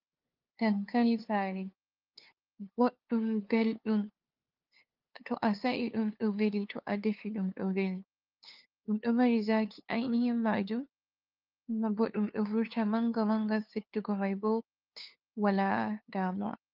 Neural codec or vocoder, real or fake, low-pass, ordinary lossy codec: codec, 16 kHz, 2 kbps, FunCodec, trained on LibriTTS, 25 frames a second; fake; 5.4 kHz; Opus, 32 kbps